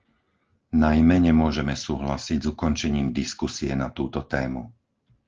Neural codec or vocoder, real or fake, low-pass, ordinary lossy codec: none; real; 7.2 kHz; Opus, 16 kbps